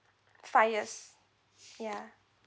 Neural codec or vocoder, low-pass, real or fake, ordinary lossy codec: none; none; real; none